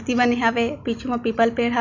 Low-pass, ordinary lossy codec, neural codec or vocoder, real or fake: 7.2 kHz; none; none; real